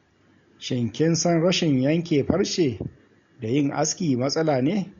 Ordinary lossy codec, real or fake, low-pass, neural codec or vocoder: MP3, 48 kbps; real; 7.2 kHz; none